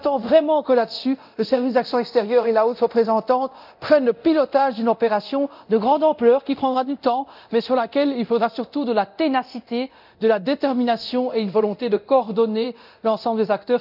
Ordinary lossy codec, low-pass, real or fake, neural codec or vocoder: none; 5.4 kHz; fake; codec, 24 kHz, 0.9 kbps, DualCodec